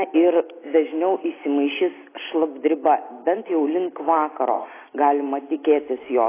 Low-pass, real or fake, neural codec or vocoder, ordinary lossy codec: 3.6 kHz; real; none; AAC, 16 kbps